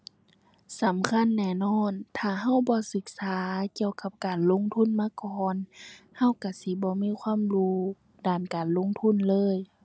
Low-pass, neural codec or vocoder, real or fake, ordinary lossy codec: none; none; real; none